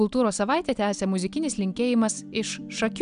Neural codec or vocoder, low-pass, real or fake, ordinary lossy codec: none; 9.9 kHz; real; MP3, 96 kbps